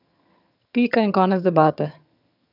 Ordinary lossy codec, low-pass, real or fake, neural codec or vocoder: none; 5.4 kHz; fake; vocoder, 22.05 kHz, 80 mel bands, HiFi-GAN